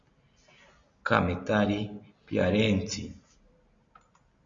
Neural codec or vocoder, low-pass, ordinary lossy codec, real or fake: none; 7.2 kHz; Opus, 64 kbps; real